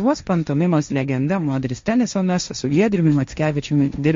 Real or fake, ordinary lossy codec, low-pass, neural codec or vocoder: fake; MP3, 48 kbps; 7.2 kHz; codec, 16 kHz, 1.1 kbps, Voila-Tokenizer